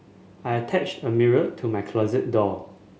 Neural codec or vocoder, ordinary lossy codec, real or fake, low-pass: none; none; real; none